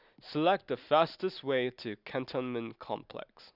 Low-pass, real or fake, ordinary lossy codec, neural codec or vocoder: 5.4 kHz; real; none; none